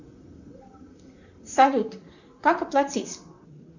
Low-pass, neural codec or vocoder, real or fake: 7.2 kHz; vocoder, 44.1 kHz, 128 mel bands, Pupu-Vocoder; fake